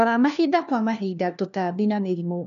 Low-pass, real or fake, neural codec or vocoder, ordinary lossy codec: 7.2 kHz; fake; codec, 16 kHz, 1 kbps, FunCodec, trained on LibriTTS, 50 frames a second; none